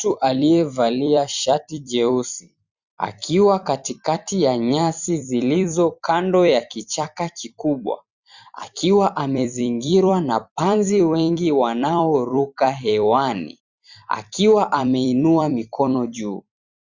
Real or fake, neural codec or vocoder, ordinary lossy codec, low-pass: fake; vocoder, 44.1 kHz, 128 mel bands every 256 samples, BigVGAN v2; Opus, 64 kbps; 7.2 kHz